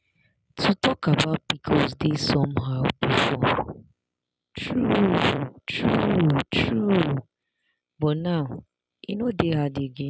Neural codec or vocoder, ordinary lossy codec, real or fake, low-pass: none; none; real; none